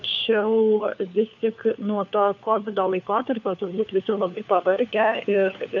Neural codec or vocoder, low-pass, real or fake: codec, 16 kHz, 4 kbps, FunCodec, trained on LibriTTS, 50 frames a second; 7.2 kHz; fake